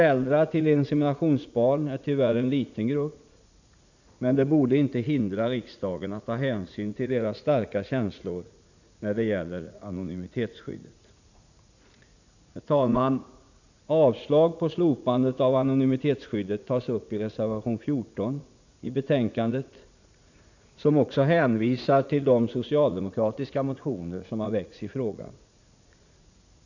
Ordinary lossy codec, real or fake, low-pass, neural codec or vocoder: none; fake; 7.2 kHz; vocoder, 44.1 kHz, 80 mel bands, Vocos